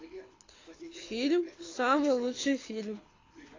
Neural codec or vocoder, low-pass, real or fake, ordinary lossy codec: codec, 24 kHz, 6 kbps, HILCodec; 7.2 kHz; fake; AAC, 32 kbps